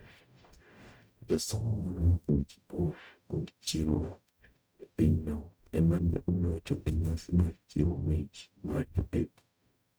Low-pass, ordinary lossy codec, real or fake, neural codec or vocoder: none; none; fake; codec, 44.1 kHz, 0.9 kbps, DAC